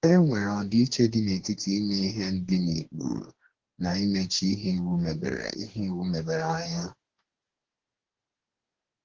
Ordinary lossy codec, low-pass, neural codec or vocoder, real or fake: Opus, 16 kbps; 7.2 kHz; codec, 44.1 kHz, 2.6 kbps, DAC; fake